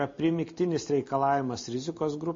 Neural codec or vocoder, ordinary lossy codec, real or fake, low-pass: none; MP3, 32 kbps; real; 7.2 kHz